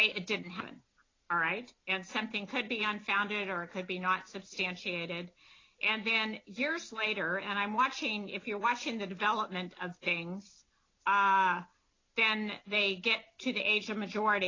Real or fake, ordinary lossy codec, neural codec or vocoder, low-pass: real; AAC, 32 kbps; none; 7.2 kHz